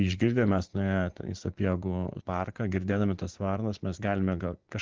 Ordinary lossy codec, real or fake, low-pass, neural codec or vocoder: Opus, 16 kbps; fake; 7.2 kHz; vocoder, 24 kHz, 100 mel bands, Vocos